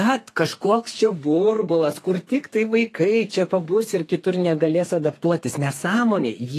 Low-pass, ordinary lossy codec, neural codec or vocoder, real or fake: 14.4 kHz; AAC, 48 kbps; codec, 32 kHz, 1.9 kbps, SNAC; fake